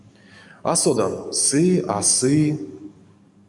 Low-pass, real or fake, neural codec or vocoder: 10.8 kHz; fake; codec, 44.1 kHz, 7.8 kbps, DAC